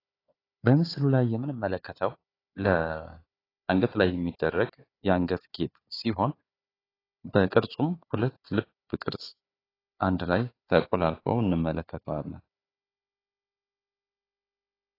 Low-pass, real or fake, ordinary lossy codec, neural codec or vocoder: 5.4 kHz; fake; AAC, 24 kbps; codec, 16 kHz, 4 kbps, FunCodec, trained on Chinese and English, 50 frames a second